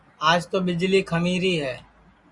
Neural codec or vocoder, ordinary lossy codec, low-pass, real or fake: none; Opus, 64 kbps; 10.8 kHz; real